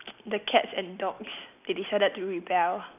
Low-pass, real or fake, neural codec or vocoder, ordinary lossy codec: 3.6 kHz; real; none; none